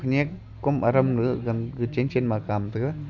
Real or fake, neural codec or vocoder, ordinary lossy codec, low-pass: real; none; none; 7.2 kHz